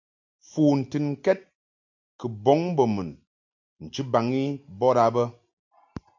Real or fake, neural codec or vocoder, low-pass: real; none; 7.2 kHz